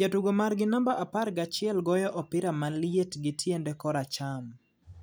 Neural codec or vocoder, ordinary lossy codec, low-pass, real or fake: none; none; none; real